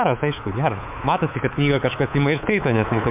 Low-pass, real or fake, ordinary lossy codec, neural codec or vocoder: 3.6 kHz; fake; MP3, 32 kbps; codec, 24 kHz, 3.1 kbps, DualCodec